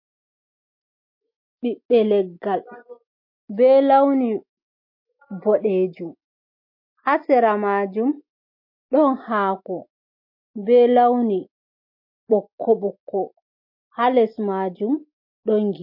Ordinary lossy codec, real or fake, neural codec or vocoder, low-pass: MP3, 32 kbps; real; none; 5.4 kHz